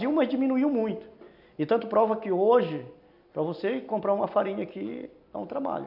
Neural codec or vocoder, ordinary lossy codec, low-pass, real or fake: none; none; 5.4 kHz; real